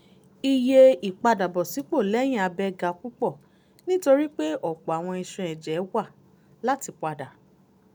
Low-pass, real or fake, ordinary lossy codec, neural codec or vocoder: none; real; none; none